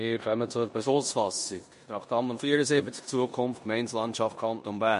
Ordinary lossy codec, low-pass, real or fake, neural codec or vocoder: MP3, 48 kbps; 10.8 kHz; fake; codec, 16 kHz in and 24 kHz out, 0.9 kbps, LongCat-Audio-Codec, four codebook decoder